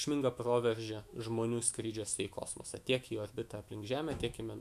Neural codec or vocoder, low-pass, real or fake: autoencoder, 48 kHz, 128 numbers a frame, DAC-VAE, trained on Japanese speech; 14.4 kHz; fake